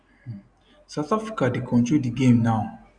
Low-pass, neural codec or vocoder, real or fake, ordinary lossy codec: 9.9 kHz; none; real; none